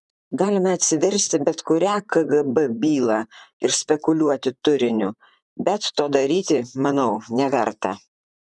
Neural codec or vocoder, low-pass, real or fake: vocoder, 44.1 kHz, 128 mel bands, Pupu-Vocoder; 10.8 kHz; fake